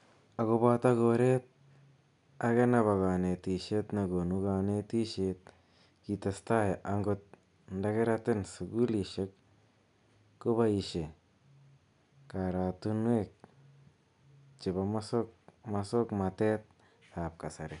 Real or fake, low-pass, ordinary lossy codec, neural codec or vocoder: real; 10.8 kHz; none; none